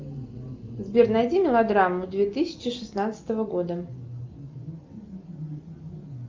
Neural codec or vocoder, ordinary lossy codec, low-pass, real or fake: none; Opus, 24 kbps; 7.2 kHz; real